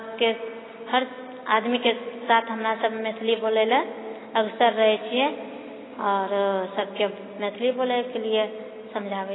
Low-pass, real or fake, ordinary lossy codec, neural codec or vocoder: 7.2 kHz; real; AAC, 16 kbps; none